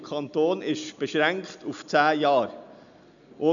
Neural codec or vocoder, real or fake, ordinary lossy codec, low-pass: none; real; none; 7.2 kHz